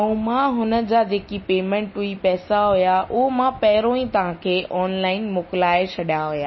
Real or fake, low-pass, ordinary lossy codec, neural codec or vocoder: real; 7.2 kHz; MP3, 24 kbps; none